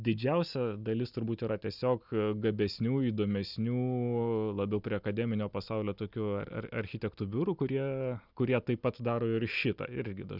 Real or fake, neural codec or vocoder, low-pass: real; none; 5.4 kHz